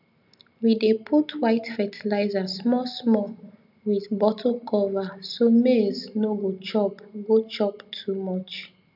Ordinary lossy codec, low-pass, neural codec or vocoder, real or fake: none; 5.4 kHz; none; real